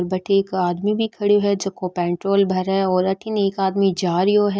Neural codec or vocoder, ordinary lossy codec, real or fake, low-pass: none; none; real; none